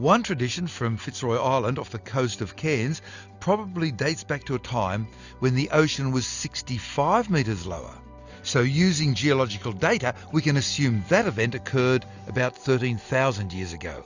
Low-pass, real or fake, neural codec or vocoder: 7.2 kHz; real; none